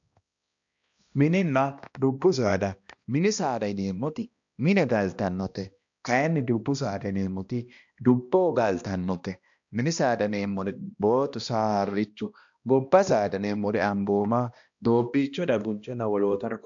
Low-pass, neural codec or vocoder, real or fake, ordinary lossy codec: 7.2 kHz; codec, 16 kHz, 1 kbps, X-Codec, HuBERT features, trained on balanced general audio; fake; AAC, 64 kbps